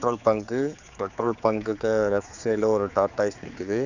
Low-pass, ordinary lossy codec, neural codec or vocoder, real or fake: 7.2 kHz; none; codec, 16 kHz, 4 kbps, X-Codec, HuBERT features, trained on general audio; fake